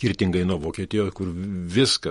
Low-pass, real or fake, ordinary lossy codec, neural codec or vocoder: 19.8 kHz; fake; MP3, 48 kbps; vocoder, 48 kHz, 128 mel bands, Vocos